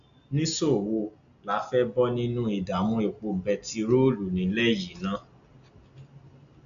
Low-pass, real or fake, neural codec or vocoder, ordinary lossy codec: 7.2 kHz; real; none; none